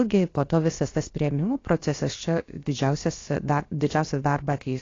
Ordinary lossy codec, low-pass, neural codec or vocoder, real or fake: AAC, 32 kbps; 7.2 kHz; codec, 16 kHz, about 1 kbps, DyCAST, with the encoder's durations; fake